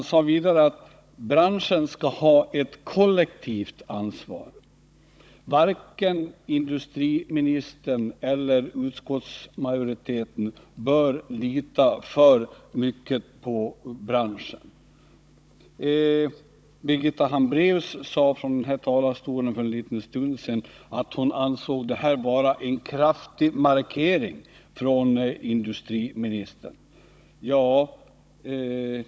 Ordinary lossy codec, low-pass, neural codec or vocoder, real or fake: none; none; codec, 16 kHz, 16 kbps, FunCodec, trained on Chinese and English, 50 frames a second; fake